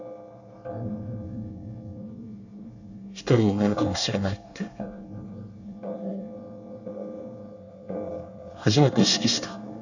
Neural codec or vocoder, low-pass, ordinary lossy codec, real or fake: codec, 24 kHz, 1 kbps, SNAC; 7.2 kHz; none; fake